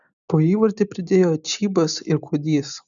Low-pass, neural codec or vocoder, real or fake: 7.2 kHz; none; real